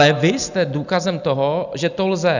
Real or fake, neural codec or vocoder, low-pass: real; none; 7.2 kHz